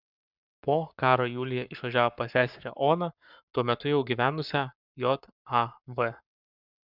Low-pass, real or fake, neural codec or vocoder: 5.4 kHz; fake; codec, 44.1 kHz, 7.8 kbps, Pupu-Codec